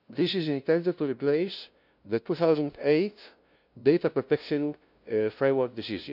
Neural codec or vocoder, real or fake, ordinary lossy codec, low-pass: codec, 16 kHz, 0.5 kbps, FunCodec, trained on LibriTTS, 25 frames a second; fake; none; 5.4 kHz